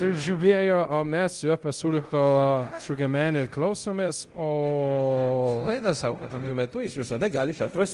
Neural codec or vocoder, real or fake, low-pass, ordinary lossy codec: codec, 24 kHz, 0.5 kbps, DualCodec; fake; 10.8 kHz; Opus, 24 kbps